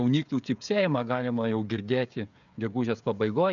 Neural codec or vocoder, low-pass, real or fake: codec, 16 kHz, 16 kbps, FreqCodec, smaller model; 7.2 kHz; fake